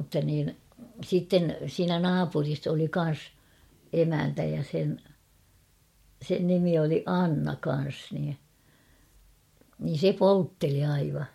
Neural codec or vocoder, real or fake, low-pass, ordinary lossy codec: none; real; 19.8 kHz; MP3, 64 kbps